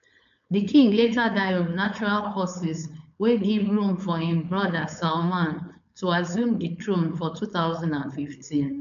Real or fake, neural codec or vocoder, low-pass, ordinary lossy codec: fake; codec, 16 kHz, 4.8 kbps, FACodec; 7.2 kHz; none